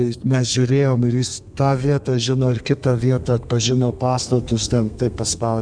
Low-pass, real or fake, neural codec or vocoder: 9.9 kHz; fake; codec, 32 kHz, 1.9 kbps, SNAC